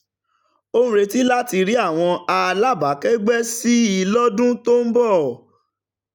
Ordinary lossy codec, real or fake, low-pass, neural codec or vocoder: none; real; 19.8 kHz; none